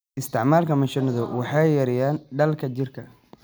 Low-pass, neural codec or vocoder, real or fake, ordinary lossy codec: none; none; real; none